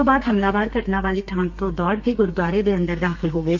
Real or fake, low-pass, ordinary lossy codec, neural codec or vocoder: fake; 7.2 kHz; MP3, 48 kbps; codec, 32 kHz, 1.9 kbps, SNAC